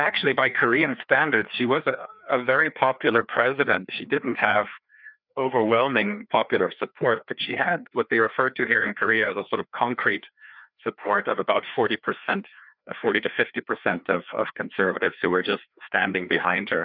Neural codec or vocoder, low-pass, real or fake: codec, 16 kHz, 2 kbps, FreqCodec, larger model; 5.4 kHz; fake